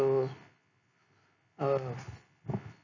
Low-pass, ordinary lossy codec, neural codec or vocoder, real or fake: 7.2 kHz; none; none; real